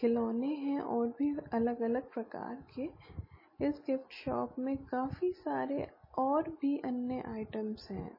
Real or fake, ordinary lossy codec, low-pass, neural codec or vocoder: real; MP3, 24 kbps; 5.4 kHz; none